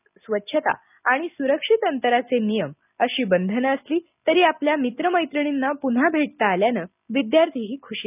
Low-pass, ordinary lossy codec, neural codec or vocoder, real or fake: 3.6 kHz; none; none; real